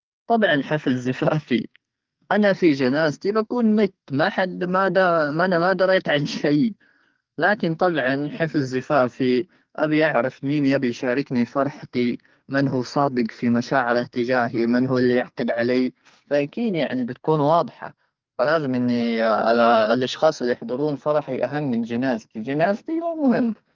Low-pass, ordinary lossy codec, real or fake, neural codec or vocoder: 7.2 kHz; Opus, 32 kbps; fake; codec, 44.1 kHz, 2.6 kbps, SNAC